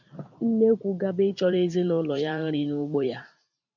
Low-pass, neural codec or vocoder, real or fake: 7.2 kHz; vocoder, 44.1 kHz, 128 mel bands every 512 samples, BigVGAN v2; fake